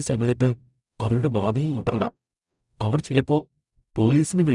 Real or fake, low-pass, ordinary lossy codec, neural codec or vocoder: fake; 10.8 kHz; none; codec, 44.1 kHz, 0.9 kbps, DAC